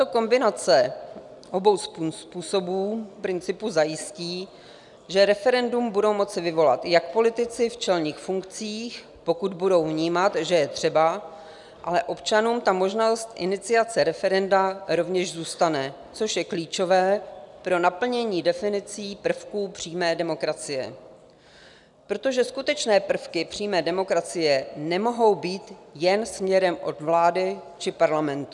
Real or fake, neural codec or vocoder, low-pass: real; none; 10.8 kHz